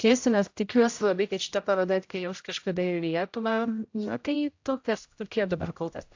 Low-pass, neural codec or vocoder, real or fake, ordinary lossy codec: 7.2 kHz; codec, 16 kHz, 0.5 kbps, X-Codec, HuBERT features, trained on general audio; fake; AAC, 48 kbps